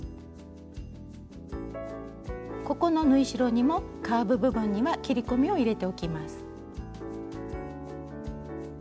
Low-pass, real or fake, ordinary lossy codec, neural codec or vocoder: none; real; none; none